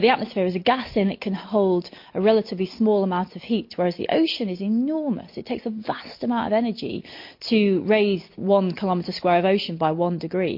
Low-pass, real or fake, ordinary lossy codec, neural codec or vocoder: 5.4 kHz; real; MP3, 32 kbps; none